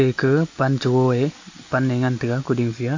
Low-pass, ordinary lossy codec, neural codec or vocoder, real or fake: 7.2 kHz; AAC, 48 kbps; none; real